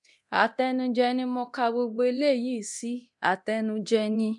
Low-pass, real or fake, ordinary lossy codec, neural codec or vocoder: 10.8 kHz; fake; none; codec, 24 kHz, 0.9 kbps, DualCodec